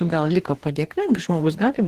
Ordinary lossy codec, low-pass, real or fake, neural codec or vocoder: Opus, 16 kbps; 14.4 kHz; fake; codec, 44.1 kHz, 2.6 kbps, DAC